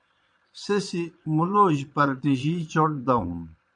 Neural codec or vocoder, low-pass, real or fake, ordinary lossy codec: vocoder, 22.05 kHz, 80 mel bands, Vocos; 9.9 kHz; fake; MP3, 96 kbps